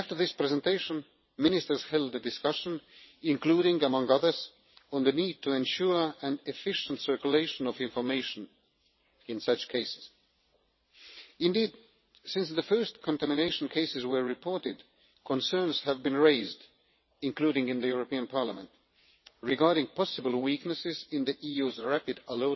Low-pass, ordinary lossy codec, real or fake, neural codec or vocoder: 7.2 kHz; MP3, 24 kbps; fake; vocoder, 22.05 kHz, 80 mel bands, WaveNeXt